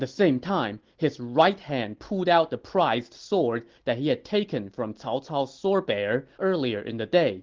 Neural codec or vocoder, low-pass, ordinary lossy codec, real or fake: none; 7.2 kHz; Opus, 16 kbps; real